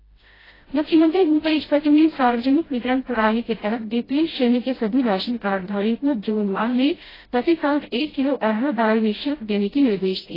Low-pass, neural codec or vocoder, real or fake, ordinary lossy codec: 5.4 kHz; codec, 16 kHz, 0.5 kbps, FreqCodec, smaller model; fake; AAC, 24 kbps